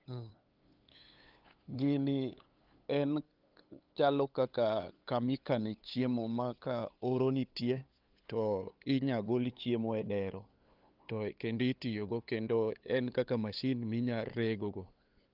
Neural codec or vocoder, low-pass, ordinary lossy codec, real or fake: codec, 16 kHz, 8 kbps, FunCodec, trained on LibriTTS, 25 frames a second; 5.4 kHz; Opus, 24 kbps; fake